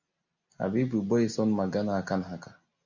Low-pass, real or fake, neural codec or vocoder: 7.2 kHz; real; none